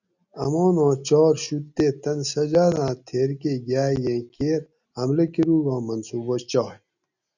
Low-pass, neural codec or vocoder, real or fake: 7.2 kHz; none; real